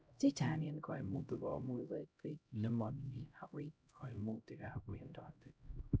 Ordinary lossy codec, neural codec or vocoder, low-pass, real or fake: none; codec, 16 kHz, 0.5 kbps, X-Codec, HuBERT features, trained on LibriSpeech; none; fake